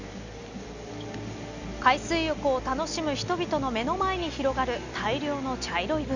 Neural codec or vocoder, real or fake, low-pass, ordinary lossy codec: none; real; 7.2 kHz; none